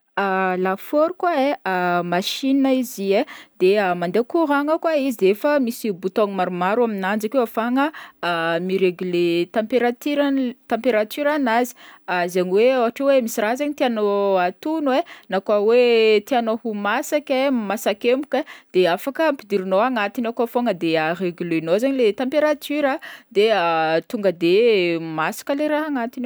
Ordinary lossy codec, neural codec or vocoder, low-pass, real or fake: none; none; none; real